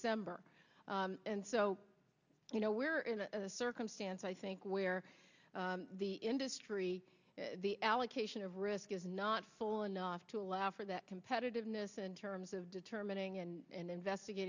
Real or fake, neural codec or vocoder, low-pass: real; none; 7.2 kHz